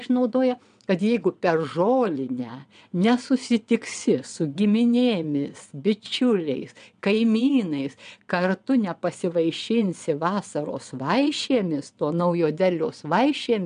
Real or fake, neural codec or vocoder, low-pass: fake; vocoder, 22.05 kHz, 80 mel bands, WaveNeXt; 9.9 kHz